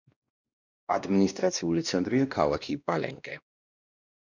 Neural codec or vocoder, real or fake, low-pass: codec, 16 kHz, 1 kbps, X-Codec, WavLM features, trained on Multilingual LibriSpeech; fake; 7.2 kHz